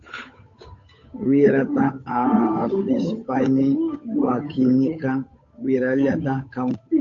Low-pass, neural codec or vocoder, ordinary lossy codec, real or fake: 7.2 kHz; codec, 16 kHz, 8 kbps, FunCodec, trained on Chinese and English, 25 frames a second; AAC, 48 kbps; fake